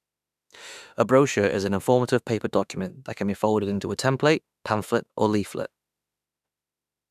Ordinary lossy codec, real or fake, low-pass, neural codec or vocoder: none; fake; 14.4 kHz; autoencoder, 48 kHz, 32 numbers a frame, DAC-VAE, trained on Japanese speech